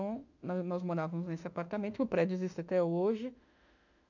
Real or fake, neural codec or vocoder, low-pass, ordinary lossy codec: fake; autoencoder, 48 kHz, 32 numbers a frame, DAC-VAE, trained on Japanese speech; 7.2 kHz; none